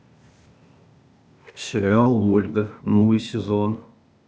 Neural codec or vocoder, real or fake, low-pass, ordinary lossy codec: codec, 16 kHz, 0.8 kbps, ZipCodec; fake; none; none